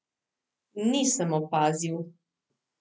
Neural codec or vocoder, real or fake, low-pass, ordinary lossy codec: none; real; none; none